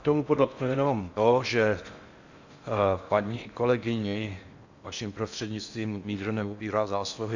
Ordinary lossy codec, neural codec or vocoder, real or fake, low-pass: Opus, 64 kbps; codec, 16 kHz in and 24 kHz out, 0.6 kbps, FocalCodec, streaming, 4096 codes; fake; 7.2 kHz